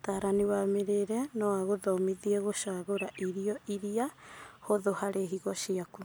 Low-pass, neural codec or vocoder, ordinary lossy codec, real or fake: none; none; none; real